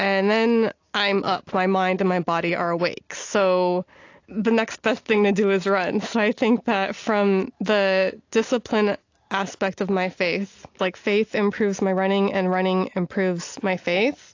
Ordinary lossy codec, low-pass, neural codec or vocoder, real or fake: AAC, 48 kbps; 7.2 kHz; none; real